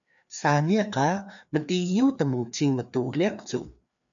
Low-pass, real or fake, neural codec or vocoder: 7.2 kHz; fake; codec, 16 kHz, 2 kbps, FreqCodec, larger model